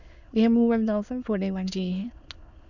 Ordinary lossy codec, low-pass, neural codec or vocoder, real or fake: Opus, 64 kbps; 7.2 kHz; autoencoder, 22.05 kHz, a latent of 192 numbers a frame, VITS, trained on many speakers; fake